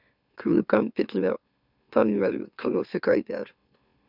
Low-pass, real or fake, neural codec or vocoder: 5.4 kHz; fake; autoencoder, 44.1 kHz, a latent of 192 numbers a frame, MeloTTS